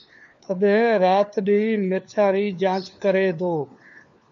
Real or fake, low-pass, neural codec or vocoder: fake; 7.2 kHz; codec, 16 kHz, 4 kbps, FunCodec, trained on LibriTTS, 50 frames a second